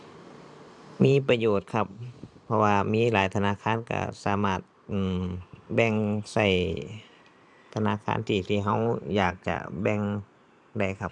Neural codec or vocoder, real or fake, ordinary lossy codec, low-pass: vocoder, 44.1 kHz, 128 mel bands every 512 samples, BigVGAN v2; fake; none; 10.8 kHz